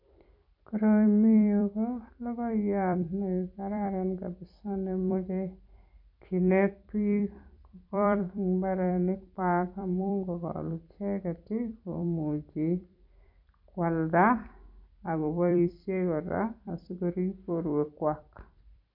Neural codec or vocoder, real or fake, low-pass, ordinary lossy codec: vocoder, 44.1 kHz, 128 mel bands every 256 samples, BigVGAN v2; fake; 5.4 kHz; none